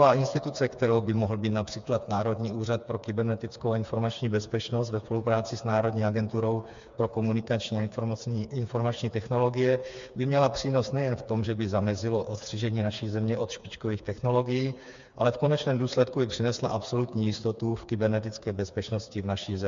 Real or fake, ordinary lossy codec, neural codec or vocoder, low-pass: fake; MP3, 64 kbps; codec, 16 kHz, 4 kbps, FreqCodec, smaller model; 7.2 kHz